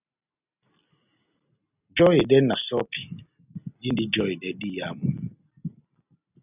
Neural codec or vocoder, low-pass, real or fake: none; 3.6 kHz; real